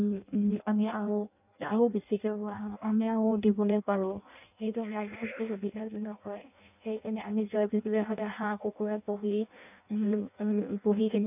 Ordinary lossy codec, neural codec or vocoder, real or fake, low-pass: none; codec, 16 kHz in and 24 kHz out, 0.6 kbps, FireRedTTS-2 codec; fake; 3.6 kHz